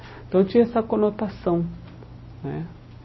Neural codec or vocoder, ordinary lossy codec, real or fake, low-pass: none; MP3, 24 kbps; real; 7.2 kHz